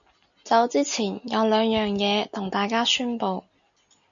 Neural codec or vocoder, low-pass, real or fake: none; 7.2 kHz; real